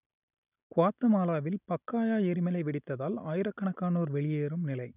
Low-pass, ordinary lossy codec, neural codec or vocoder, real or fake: 3.6 kHz; none; none; real